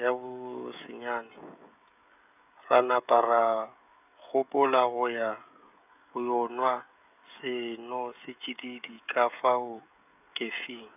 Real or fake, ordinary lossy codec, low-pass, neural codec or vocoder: fake; none; 3.6 kHz; codec, 16 kHz, 16 kbps, FreqCodec, smaller model